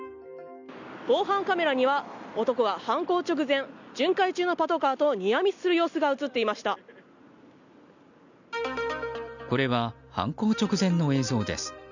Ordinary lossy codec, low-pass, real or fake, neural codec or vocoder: none; 7.2 kHz; real; none